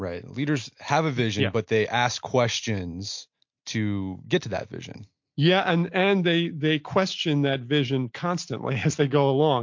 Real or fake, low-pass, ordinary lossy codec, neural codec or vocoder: real; 7.2 kHz; MP3, 48 kbps; none